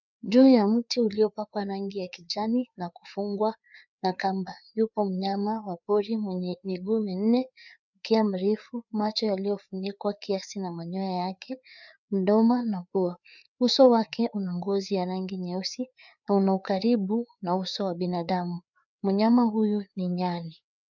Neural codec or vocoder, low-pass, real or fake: codec, 16 kHz, 4 kbps, FreqCodec, larger model; 7.2 kHz; fake